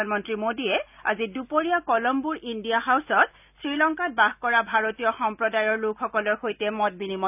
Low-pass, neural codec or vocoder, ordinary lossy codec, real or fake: 3.6 kHz; none; none; real